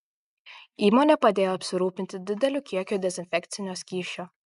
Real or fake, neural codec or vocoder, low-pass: real; none; 10.8 kHz